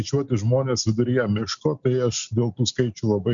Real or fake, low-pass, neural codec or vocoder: real; 7.2 kHz; none